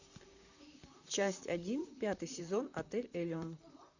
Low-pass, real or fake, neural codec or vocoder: 7.2 kHz; fake; vocoder, 44.1 kHz, 128 mel bands, Pupu-Vocoder